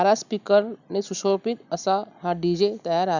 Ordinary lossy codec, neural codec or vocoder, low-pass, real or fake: none; none; 7.2 kHz; real